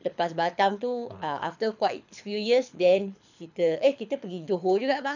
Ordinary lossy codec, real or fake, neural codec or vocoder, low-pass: none; fake; codec, 24 kHz, 6 kbps, HILCodec; 7.2 kHz